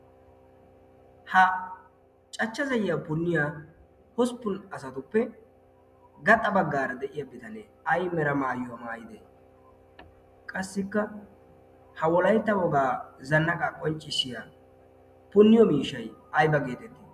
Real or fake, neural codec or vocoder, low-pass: real; none; 14.4 kHz